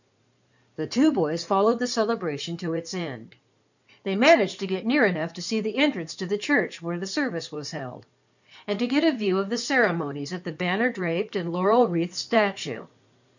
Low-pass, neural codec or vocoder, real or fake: 7.2 kHz; codec, 16 kHz in and 24 kHz out, 2.2 kbps, FireRedTTS-2 codec; fake